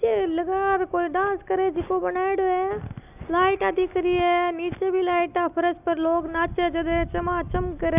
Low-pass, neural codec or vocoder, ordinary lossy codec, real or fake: 3.6 kHz; none; none; real